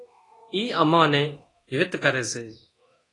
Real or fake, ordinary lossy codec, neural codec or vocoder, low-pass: fake; AAC, 32 kbps; codec, 24 kHz, 0.9 kbps, DualCodec; 10.8 kHz